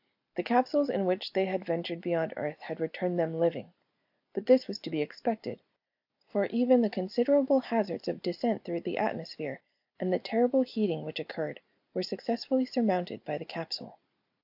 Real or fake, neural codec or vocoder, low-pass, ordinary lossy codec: real; none; 5.4 kHz; AAC, 48 kbps